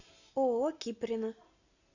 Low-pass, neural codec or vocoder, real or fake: 7.2 kHz; none; real